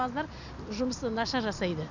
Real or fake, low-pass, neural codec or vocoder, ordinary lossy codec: real; 7.2 kHz; none; none